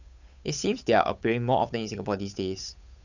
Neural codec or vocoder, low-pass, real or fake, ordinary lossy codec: codec, 16 kHz, 8 kbps, FunCodec, trained on Chinese and English, 25 frames a second; 7.2 kHz; fake; none